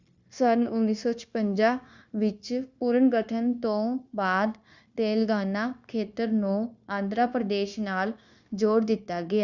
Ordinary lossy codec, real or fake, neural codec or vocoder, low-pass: Opus, 64 kbps; fake; codec, 16 kHz, 0.9 kbps, LongCat-Audio-Codec; 7.2 kHz